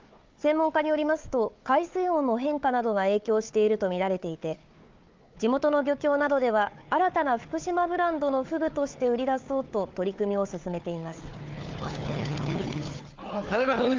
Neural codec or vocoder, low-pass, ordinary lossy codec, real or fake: codec, 16 kHz, 4 kbps, FunCodec, trained on Chinese and English, 50 frames a second; 7.2 kHz; Opus, 24 kbps; fake